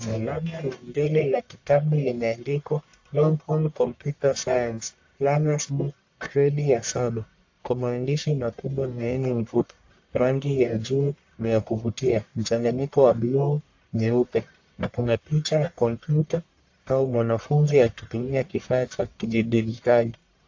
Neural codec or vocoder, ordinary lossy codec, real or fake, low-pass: codec, 44.1 kHz, 1.7 kbps, Pupu-Codec; AAC, 48 kbps; fake; 7.2 kHz